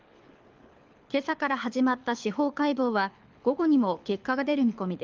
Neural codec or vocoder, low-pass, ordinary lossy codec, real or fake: codec, 24 kHz, 6 kbps, HILCodec; 7.2 kHz; Opus, 24 kbps; fake